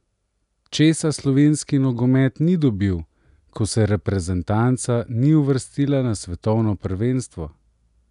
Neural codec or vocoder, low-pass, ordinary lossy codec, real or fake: none; 10.8 kHz; none; real